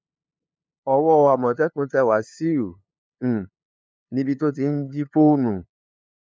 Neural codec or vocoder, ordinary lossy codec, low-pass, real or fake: codec, 16 kHz, 2 kbps, FunCodec, trained on LibriTTS, 25 frames a second; none; none; fake